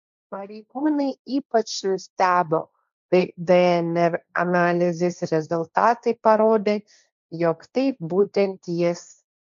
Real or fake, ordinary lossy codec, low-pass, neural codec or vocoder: fake; MP3, 64 kbps; 7.2 kHz; codec, 16 kHz, 1.1 kbps, Voila-Tokenizer